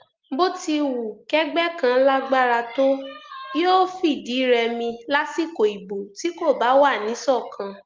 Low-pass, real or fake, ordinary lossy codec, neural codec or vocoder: 7.2 kHz; real; Opus, 32 kbps; none